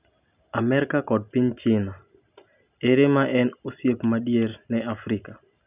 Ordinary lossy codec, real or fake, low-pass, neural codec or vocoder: none; real; 3.6 kHz; none